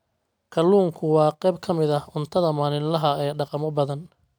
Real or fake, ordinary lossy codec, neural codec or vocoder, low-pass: real; none; none; none